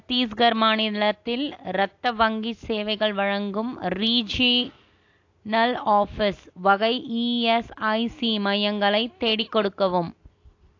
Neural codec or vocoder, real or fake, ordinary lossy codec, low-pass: none; real; AAC, 48 kbps; 7.2 kHz